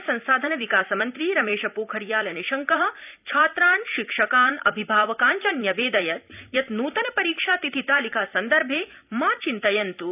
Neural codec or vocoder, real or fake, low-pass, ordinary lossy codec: vocoder, 44.1 kHz, 128 mel bands every 256 samples, BigVGAN v2; fake; 3.6 kHz; none